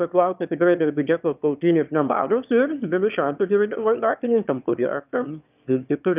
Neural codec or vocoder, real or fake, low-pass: autoencoder, 22.05 kHz, a latent of 192 numbers a frame, VITS, trained on one speaker; fake; 3.6 kHz